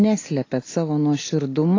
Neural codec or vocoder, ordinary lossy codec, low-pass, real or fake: none; AAC, 32 kbps; 7.2 kHz; real